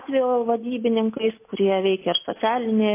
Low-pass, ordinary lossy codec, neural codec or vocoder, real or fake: 3.6 kHz; MP3, 24 kbps; none; real